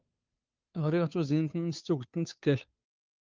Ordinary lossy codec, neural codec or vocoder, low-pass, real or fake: Opus, 24 kbps; codec, 16 kHz, 2 kbps, FunCodec, trained on Chinese and English, 25 frames a second; 7.2 kHz; fake